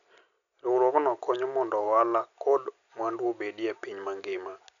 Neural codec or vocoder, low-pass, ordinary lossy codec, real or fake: none; 7.2 kHz; none; real